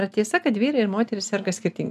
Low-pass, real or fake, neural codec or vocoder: 14.4 kHz; real; none